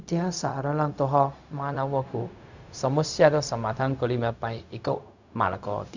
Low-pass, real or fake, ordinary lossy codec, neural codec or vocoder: 7.2 kHz; fake; none; codec, 16 kHz, 0.4 kbps, LongCat-Audio-Codec